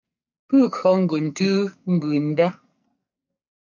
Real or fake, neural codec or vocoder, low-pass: fake; codec, 44.1 kHz, 2.6 kbps, SNAC; 7.2 kHz